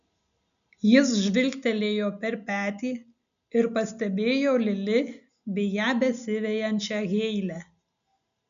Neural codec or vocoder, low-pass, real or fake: none; 7.2 kHz; real